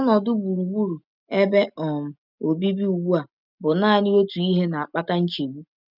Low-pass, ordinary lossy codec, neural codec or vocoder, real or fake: 5.4 kHz; none; none; real